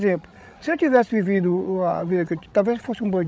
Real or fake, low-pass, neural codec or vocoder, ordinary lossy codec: fake; none; codec, 16 kHz, 16 kbps, FreqCodec, larger model; none